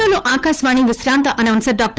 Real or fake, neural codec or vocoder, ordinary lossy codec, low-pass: fake; codec, 16 kHz, 8 kbps, FunCodec, trained on Chinese and English, 25 frames a second; none; none